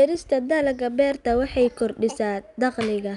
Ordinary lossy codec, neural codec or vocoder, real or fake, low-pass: none; none; real; 10.8 kHz